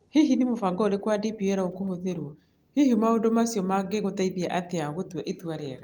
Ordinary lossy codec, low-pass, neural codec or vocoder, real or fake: Opus, 32 kbps; 19.8 kHz; none; real